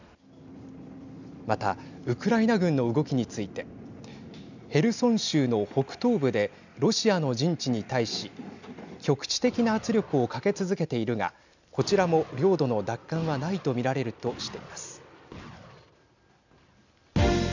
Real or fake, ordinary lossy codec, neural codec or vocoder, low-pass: real; none; none; 7.2 kHz